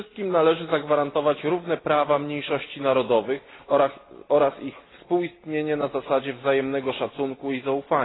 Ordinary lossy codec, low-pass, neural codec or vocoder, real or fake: AAC, 16 kbps; 7.2 kHz; none; real